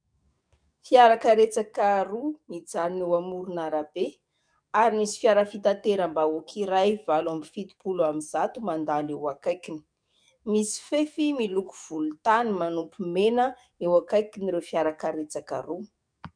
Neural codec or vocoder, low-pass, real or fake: codec, 44.1 kHz, 7.8 kbps, DAC; 9.9 kHz; fake